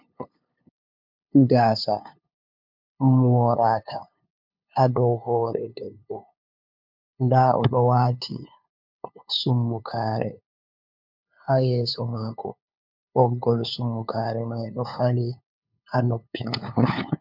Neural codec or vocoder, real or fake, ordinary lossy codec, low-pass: codec, 16 kHz, 2 kbps, FunCodec, trained on LibriTTS, 25 frames a second; fake; MP3, 48 kbps; 5.4 kHz